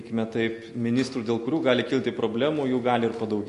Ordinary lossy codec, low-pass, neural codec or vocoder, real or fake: MP3, 48 kbps; 14.4 kHz; none; real